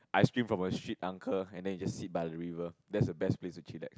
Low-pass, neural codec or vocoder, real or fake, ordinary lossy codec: none; none; real; none